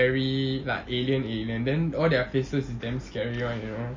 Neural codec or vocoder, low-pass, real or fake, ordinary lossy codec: none; 7.2 kHz; real; MP3, 32 kbps